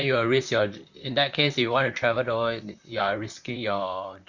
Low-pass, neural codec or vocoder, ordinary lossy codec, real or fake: 7.2 kHz; vocoder, 44.1 kHz, 128 mel bands, Pupu-Vocoder; none; fake